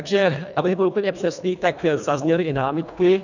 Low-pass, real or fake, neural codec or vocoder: 7.2 kHz; fake; codec, 24 kHz, 1.5 kbps, HILCodec